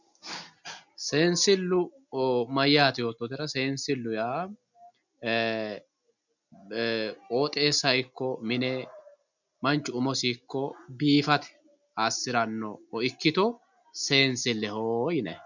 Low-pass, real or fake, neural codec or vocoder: 7.2 kHz; real; none